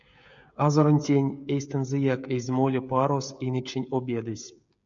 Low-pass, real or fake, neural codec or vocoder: 7.2 kHz; fake; codec, 16 kHz, 16 kbps, FreqCodec, smaller model